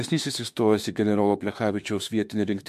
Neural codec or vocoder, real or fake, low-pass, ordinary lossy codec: autoencoder, 48 kHz, 32 numbers a frame, DAC-VAE, trained on Japanese speech; fake; 14.4 kHz; MP3, 64 kbps